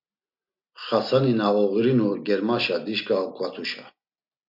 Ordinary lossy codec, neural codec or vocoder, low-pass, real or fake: MP3, 48 kbps; none; 5.4 kHz; real